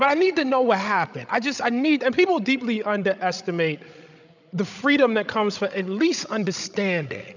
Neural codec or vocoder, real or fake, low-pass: codec, 16 kHz, 16 kbps, FreqCodec, larger model; fake; 7.2 kHz